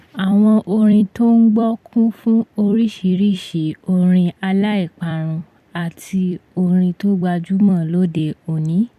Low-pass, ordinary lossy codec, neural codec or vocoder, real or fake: 14.4 kHz; none; vocoder, 44.1 kHz, 128 mel bands every 256 samples, BigVGAN v2; fake